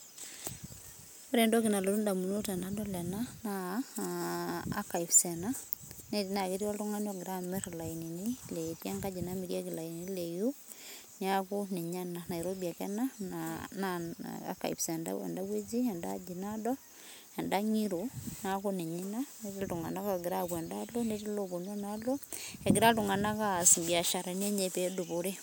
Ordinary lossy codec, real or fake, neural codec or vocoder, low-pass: none; real; none; none